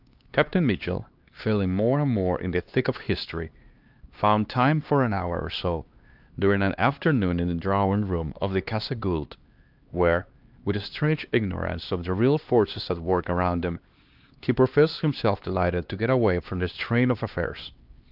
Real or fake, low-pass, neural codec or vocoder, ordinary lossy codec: fake; 5.4 kHz; codec, 16 kHz, 2 kbps, X-Codec, HuBERT features, trained on LibriSpeech; Opus, 24 kbps